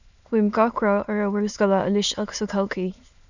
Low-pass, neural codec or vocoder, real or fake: 7.2 kHz; autoencoder, 22.05 kHz, a latent of 192 numbers a frame, VITS, trained on many speakers; fake